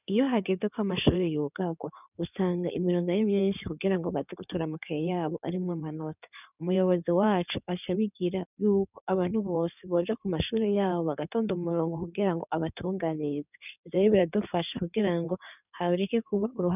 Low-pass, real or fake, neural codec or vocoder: 3.6 kHz; fake; codec, 16 kHz in and 24 kHz out, 2.2 kbps, FireRedTTS-2 codec